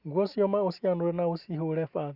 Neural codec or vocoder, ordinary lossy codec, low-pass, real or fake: none; Opus, 64 kbps; 5.4 kHz; real